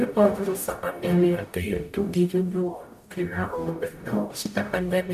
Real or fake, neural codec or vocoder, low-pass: fake; codec, 44.1 kHz, 0.9 kbps, DAC; 14.4 kHz